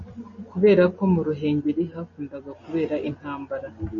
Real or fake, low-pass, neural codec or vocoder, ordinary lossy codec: real; 7.2 kHz; none; MP3, 32 kbps